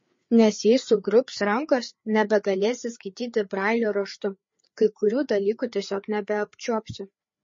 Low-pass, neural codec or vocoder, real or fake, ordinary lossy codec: 7.2 kHz; codec, 16 kHz, 4 kbps, FreqCodec, larger model; fake; MP3, 32 kbps